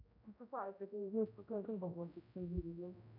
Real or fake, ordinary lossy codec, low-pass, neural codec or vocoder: fake; none; 5.4 kHz; codec, 16 kHz, 0.5 kbps, X-Codec, HuBERT features, trained on general audio